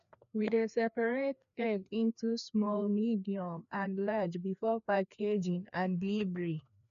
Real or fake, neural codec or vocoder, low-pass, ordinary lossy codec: fake; codec, 16 kHz, 2 kbps, FreqCodec, larger model; 7.2 kHz; MP3, 96 kbps